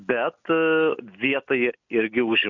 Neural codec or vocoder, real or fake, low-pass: none; real; 7.2 kHz